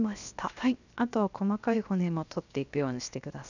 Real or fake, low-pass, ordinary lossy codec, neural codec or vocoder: fake; 7.2 kHz; none; codec, 16 kHz, 0.7 kbps, FocalCodec